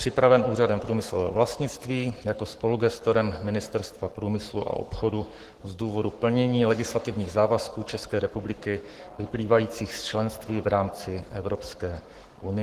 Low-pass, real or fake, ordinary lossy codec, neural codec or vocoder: 14.4 kHz; fake; Opus, 16 kbps; codec, 44.1 kHz, 7.8 kbps, Pupu-Codec